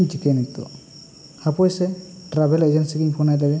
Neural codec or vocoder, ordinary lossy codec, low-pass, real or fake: none; none; none; real